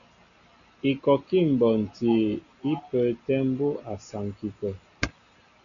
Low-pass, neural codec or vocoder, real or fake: 7.2 kHz; none; real